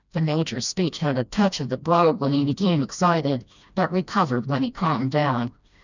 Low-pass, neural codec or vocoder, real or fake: 7.2 kHz; codec, 16 kHz, 1 kbps, FreqCodec, smaller model; fake